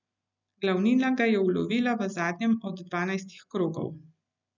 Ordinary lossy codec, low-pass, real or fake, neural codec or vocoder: none; 7.2 kHz; real; none